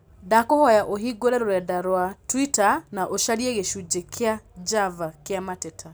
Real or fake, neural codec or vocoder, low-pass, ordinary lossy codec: real; none; none; none